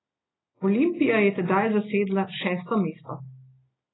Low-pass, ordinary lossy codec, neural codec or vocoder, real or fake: 7.2 kHz; AAC, 16 kbps; none; real